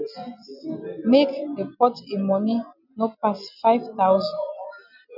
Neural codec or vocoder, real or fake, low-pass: none; real; 5.4 kHz